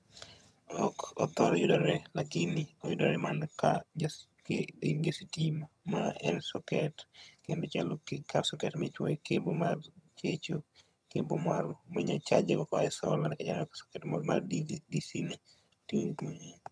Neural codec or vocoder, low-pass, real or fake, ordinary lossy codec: vocoder, 22.05 kHz, 80 mel bands, HiFi-GAN; none; fake; none